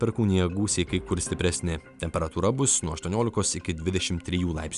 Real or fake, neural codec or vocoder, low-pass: real; none; 10.8 kHz